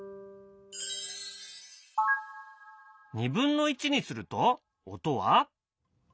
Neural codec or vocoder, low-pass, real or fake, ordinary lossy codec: none; none; real; none